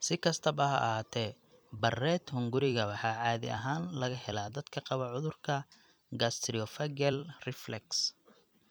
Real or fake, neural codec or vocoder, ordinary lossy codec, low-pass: fake; vocoder, 44.1 kHz, 128 mel bands every 256 samples, BigVGAN v2; none; none